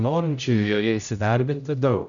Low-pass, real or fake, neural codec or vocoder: 7.2 kHz; fake; codec, 16 kHz, 0.5 kbps, X-Codec, HuBERT features, trained on general audio